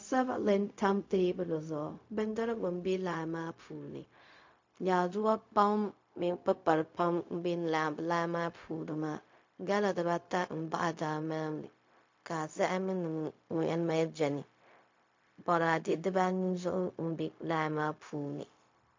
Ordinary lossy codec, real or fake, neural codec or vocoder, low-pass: MP3, 48 kbps; fake; codec, 16 kHz, 0.4 kbps, LongCat-Audio-Codec; 7.2 kHz